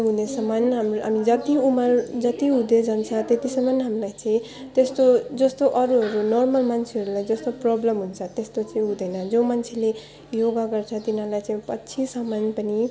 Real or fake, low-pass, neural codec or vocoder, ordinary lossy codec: real; none; none; none